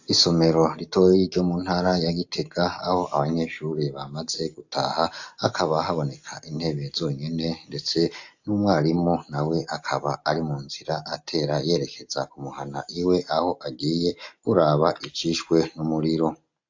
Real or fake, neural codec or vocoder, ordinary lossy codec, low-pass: real; none; AAC, 48 kbps; 7.2 kHz